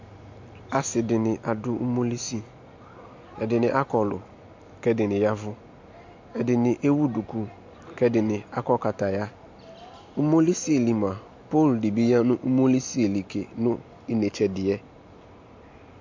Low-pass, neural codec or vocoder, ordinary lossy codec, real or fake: 7.2 kHz; none; MP3, 48 kbps; real